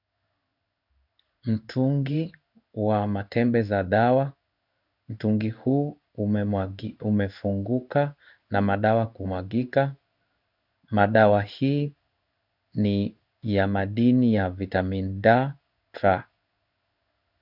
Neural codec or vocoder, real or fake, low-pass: codec, 16 kHz in and 24 kHz out, 1 kbps, XY-Tokenizer; fake; 5.4 kHz